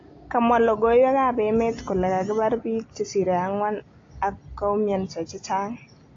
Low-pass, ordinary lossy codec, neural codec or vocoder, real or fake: 7.2 kHz; AAC, 32 kbps; none; real